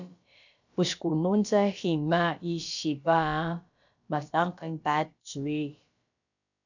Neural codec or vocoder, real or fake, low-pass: codec, 16 kHz, about 1 kbps, DyCAST, with the encoder's durations; fake; 7.2 kHz